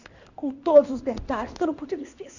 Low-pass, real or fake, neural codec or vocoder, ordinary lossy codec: 7.2 kHz; fake; codec, 16 kHz in and 24 kHz out, 1 kbps, XY-Tokenizer; Opus, 64 kbps